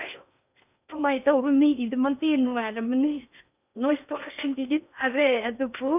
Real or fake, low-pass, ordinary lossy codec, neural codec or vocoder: fake; 3.6 kHz; none; codec, 16 kHz in and 24 kHz out, 0.8 kbps, FocalCodec, streaming, 65536 codes